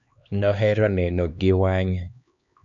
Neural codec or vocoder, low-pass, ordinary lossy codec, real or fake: codec, 16 kHz, 2 kbps, X-Codec, HuBERT features, trained on LibriSpeech; 7.2 kHz; MP3, 96 kbps; fake